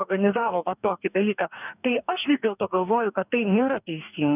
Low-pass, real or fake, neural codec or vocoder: 3.6 kHz; fake; codec, 44.1 kHz, 2.6 kbps, DAC